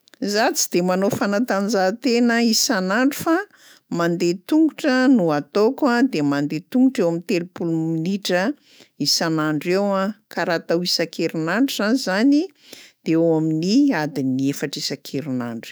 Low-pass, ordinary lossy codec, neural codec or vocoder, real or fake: none; none; autoencoder, 48 kHz, 128 numbers a frame, DAC-VAE, trained on Japanese speech; fake